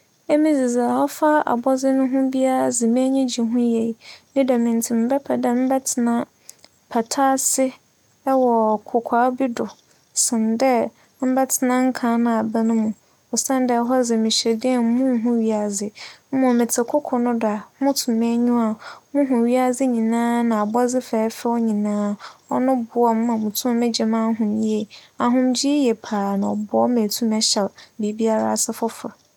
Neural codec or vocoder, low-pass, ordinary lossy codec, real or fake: none; 19.8 kHz; none; real